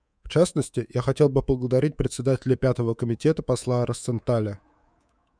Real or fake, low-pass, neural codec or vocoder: fake; 9.9 kHz; codec, 24 kHz, 3.1 kbps, DualCodec